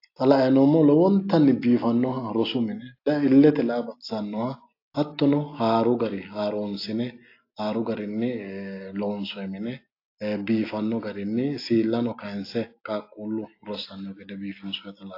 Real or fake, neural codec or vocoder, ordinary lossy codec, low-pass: real; none; AAC, 32 kbps; 5.4 kHz